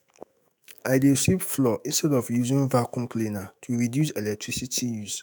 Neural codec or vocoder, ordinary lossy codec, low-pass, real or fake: autoencoder, 48 kHz, 128 numbers a frame, DAC-VAE, trained on Japanese speech; none; none; fake